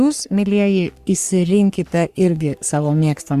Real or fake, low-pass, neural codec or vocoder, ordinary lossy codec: fake; 14.4 kHz; codec, 44.1 kHz, 3.4 kbps, Pupu-Codec; Opus, 64 kbps